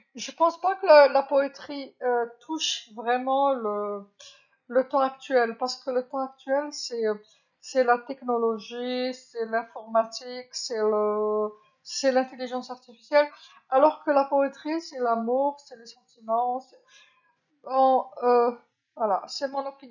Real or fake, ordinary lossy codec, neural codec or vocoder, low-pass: real; none; none; 7.2 kHz